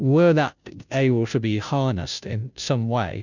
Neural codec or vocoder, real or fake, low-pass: codec, 16 kHz, 0.5 kbps, FunCodec, trained on Chinese and English, 25 frames a second; fake; 7.2 kHz